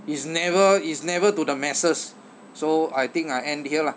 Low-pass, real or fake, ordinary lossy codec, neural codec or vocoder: none; real; none; none